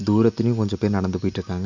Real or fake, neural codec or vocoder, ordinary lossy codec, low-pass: real; none; none; 7.2 kHz